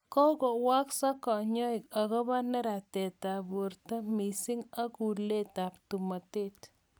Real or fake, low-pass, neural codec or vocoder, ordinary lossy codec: real; none; none; none